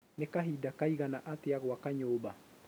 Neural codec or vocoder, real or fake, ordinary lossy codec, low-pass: none; real; none; none